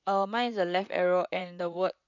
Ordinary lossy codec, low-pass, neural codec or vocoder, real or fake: none; 7.2 kHz; vocoder, 44.1 kHz, 128 mel bands, Pupu-Vocoder; fake